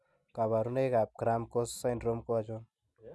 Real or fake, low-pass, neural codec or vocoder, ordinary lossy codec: real; none; none; none